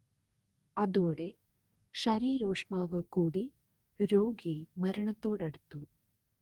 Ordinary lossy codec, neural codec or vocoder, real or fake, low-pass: Opus, 32 kbps; codec, 44.1 kHz, 2.6 kbps, DAC; fake; 19.8 kHz